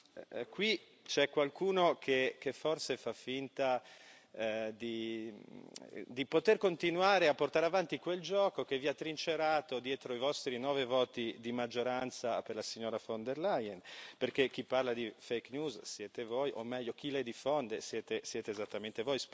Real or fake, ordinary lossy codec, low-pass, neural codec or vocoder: real; none; none; none